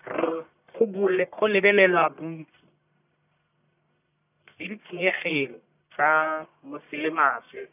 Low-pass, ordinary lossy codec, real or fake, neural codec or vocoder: 3.6 kHz; none; fake; codec, 44.1 kHz, 1.7 kbps, Pupu-Codec